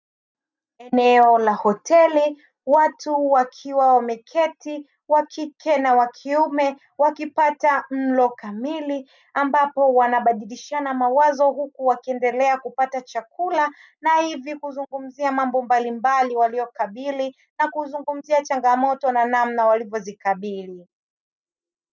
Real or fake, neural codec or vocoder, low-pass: real; none; 7.2 kHz